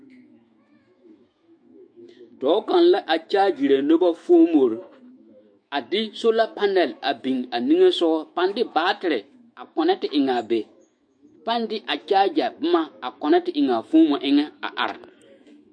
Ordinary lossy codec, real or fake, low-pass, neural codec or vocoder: MP3, 48 kbps; fake; 9.9 kHz; autoencoder, 48 kHz, 128 numbers a frame, DAC-VAE, trained on Japanese speech